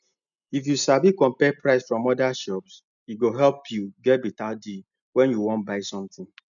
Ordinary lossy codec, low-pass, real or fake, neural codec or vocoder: none; 7.2 kHz; real; none